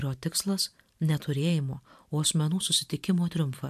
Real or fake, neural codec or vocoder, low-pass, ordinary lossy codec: fake; vocoder, 44.1 kHz, 128 mel bands every 512 samples, BigVGAN v2; 14.4 kHz; AAC, 96 kbps